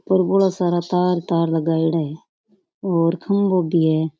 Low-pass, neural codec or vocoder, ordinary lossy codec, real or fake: none; none; none; real